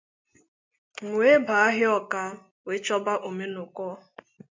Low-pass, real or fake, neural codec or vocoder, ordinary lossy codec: 7.2 kHz; real; none; MP3, 64 kbps